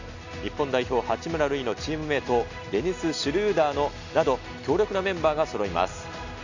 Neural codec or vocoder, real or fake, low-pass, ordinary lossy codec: none; real; 7.2 kHz; none